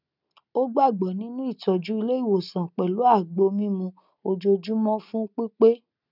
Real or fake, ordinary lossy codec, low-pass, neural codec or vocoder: real; none; 5.4 kHz; none